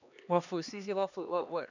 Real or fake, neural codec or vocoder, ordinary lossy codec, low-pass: fake; codec, 16 kHz, 2 kbps, X-Codec, HuBERT features, trained on LibriSpeech; none; 7.2 kHz